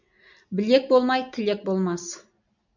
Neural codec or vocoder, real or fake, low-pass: none; real; 7.2 kHz